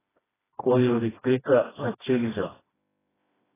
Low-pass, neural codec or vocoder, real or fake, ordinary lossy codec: 3.6 kHz; codec, 16 kHz, 1 kbps, FreqCodec, smaller model; fake; AAC, 16 kbps